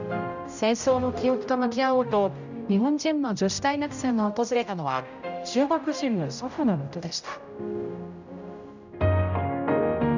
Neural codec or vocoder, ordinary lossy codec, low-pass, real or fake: codec, 16 kHz, 0.5 kbps, X-Codec, HuBERT features, trained on general audio; none; 7.2 kHz; fake